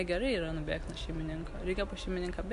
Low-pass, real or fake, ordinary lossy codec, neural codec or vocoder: 10.8 kHz; real; MP3, 64 kbps; none